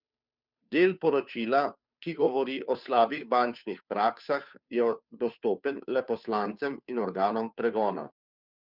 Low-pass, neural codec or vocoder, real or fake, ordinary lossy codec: 5.4 kHz; codec, 16 kHz, 2 kbps, FunCodec, trained on Chinese and English, 25 frames a second; fake; none